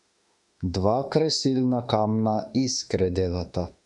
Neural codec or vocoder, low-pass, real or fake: autoencoder, 48 kHz, 32 numbers a frame, DAC-VAE, trained on Japanese speech; 10.8 kHz; fake